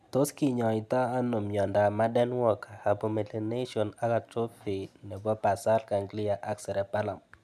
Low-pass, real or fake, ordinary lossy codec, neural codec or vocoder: 14.4 kHz; real; none; none